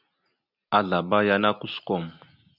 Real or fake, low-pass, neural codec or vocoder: real; 5.4 kHz; none